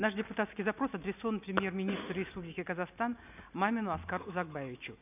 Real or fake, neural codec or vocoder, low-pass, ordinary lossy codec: real; none; 3.6 kHz; none